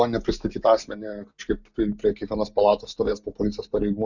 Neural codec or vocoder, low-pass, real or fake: none; 7.2 kHz; real